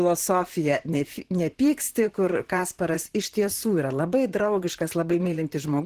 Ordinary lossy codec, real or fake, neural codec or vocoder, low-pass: Opus, 16 kbps; fake; vocoder, 44.1 kHz, 128 mel bands, Pupu-Vocoder; 14.4 kHz